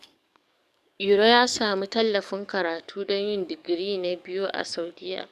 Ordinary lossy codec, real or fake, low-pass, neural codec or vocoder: none; fake; 14.4 kHz; codec, 44.1 kHz, 7.8 kbps, DAC